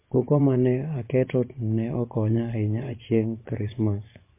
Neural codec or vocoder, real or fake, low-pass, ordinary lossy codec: vocoder, 24 kHz, 100 mel bands, Vocos; fake; 3.6 kHz; MP3, 24 kbps